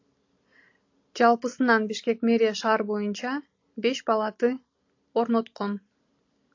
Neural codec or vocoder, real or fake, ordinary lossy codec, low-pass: none; real; AAC, 48 kbps; 7.2 kHz